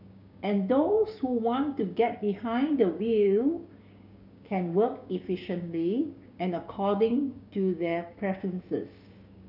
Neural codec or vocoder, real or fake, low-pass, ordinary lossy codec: codec, 16 kHz, 6 kbps, DAC; fake; 5.4 kHz; none